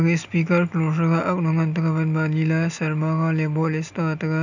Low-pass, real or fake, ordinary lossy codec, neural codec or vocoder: 7.2 kHz; real; none; none